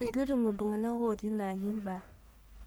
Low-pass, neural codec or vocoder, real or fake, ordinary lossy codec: none; codec, 44.1 kHz, 1.7 kbps, Pupu-Codec; fake; none